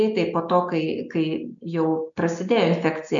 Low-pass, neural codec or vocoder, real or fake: 7.2 kHz; none; real